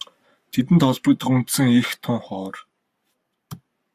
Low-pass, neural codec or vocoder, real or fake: 14.4 kHz; codec, 44.1 kHz, 7.8 kbps, Pupu-Codec; fake